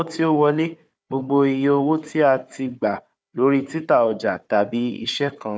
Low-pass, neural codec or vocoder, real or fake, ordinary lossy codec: none; codec, 16 kHz, 4 kbps, FunCodec, trained on Chinese and English, 50 frames a second; fake; none